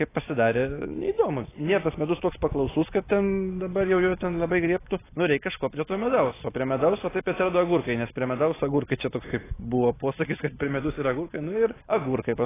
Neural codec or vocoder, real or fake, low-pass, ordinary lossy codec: none; real; 3.6 kHz; AAC, 16 kbps